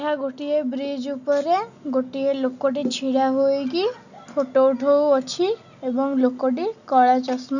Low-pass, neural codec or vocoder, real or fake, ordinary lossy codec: 7.2 kHz; none; real; none